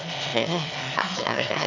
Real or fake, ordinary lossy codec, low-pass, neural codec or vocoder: fake; none; 7.2 kHz; autoencoder, 22.05 kHz, a latent of 192 numbers a frame, VITS, trained on one speaker